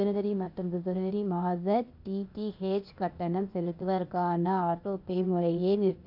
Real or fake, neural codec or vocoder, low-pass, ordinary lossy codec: fake; codec, 16 kHz, 0.8 kbps, ZipCodec; 5.4 kHz; none